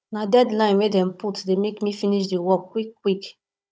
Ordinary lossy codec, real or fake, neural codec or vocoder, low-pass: none; fake; codec, 16 kHz, 16 kbps, FunCodec, trained on Chinese and English, 50 frames a second; none